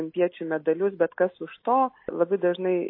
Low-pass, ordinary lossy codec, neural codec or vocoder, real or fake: 5.4 kHz; MP3, 24 kbps; none; real